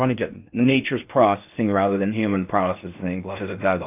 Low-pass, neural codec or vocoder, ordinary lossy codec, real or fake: 3.6 kHz; codec, 16 kHz in and 24 kHz out, 0.6 kbps, FocalCodec, streaming, 4096 codes; AAC, 32 kbps; fake